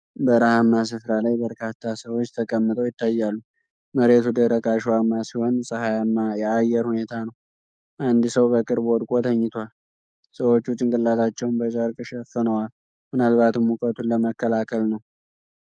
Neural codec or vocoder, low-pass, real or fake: codec, 44.1 kHz, 7.8 kbps, DAC; 9.9 kHz; fake